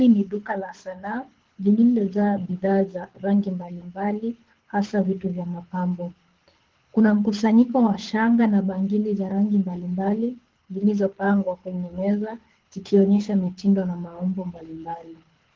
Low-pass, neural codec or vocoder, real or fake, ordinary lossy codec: 7.2 kHz; codec, 24 kHz, 6 kbps, HILCodec; fake; Opus, 16 kbps